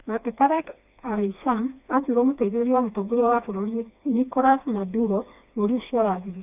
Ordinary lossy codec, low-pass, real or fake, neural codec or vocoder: none; 3.6 kHz; fake; codec, 16 kHz, 2 kbps, FreqCodec, smaller model